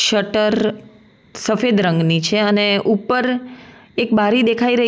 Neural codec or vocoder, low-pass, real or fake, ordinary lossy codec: none; none; real; none